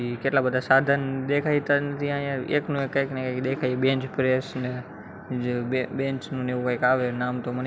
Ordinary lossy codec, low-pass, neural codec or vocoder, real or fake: none; none; none; real